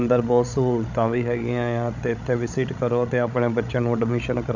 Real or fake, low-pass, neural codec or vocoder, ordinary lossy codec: fake; 7.2 kHz; codec, 16 kHz, 16 kbps, FreqCodec, larger model; none